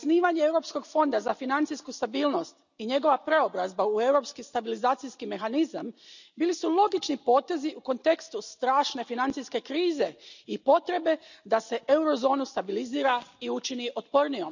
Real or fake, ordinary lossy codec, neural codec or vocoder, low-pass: real; none; none; 7.2 kHz